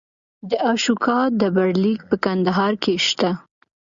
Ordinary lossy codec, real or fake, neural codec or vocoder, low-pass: Opus, 64 kbps; real; none; 7.2 kHz